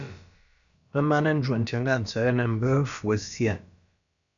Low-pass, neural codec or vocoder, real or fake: 7.2 kHz; codec, 16 kHz, about 1 kbps, DyCAST, with the encoder's durations; fake